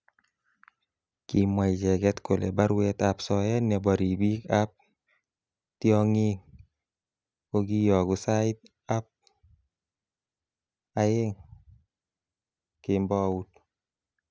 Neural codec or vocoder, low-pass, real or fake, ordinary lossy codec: none; none; real; none